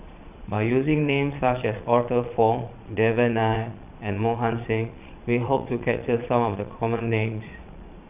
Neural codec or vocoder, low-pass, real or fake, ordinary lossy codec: vocoder, 22.05 kHz, 80 mel bands, Vocos; 3.6 kHz; fake; none